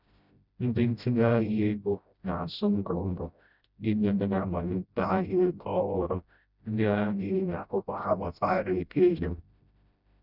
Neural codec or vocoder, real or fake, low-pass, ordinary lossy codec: codec, 16 kHz, 0.5 kbps, FreqCodec, smaller model; fake; 5.4 kHz; none